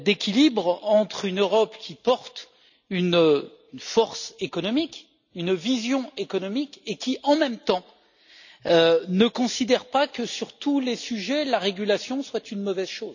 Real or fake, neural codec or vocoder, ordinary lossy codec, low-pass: real; none; none; 7.2 kHz